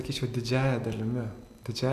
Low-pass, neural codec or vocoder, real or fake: 14.4 kHz; none; real